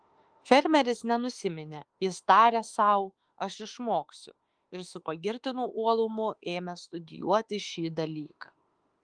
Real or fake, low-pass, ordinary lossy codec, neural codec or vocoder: fake; 9.9 kHz; Opus, 24 kbps; autoencoder, 48 kHz, 32 numbers a frame, DAC-VAE, trained on Japanese speech